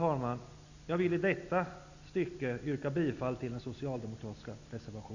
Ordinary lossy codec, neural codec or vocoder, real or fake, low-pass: none; none; real; 7.2 kHz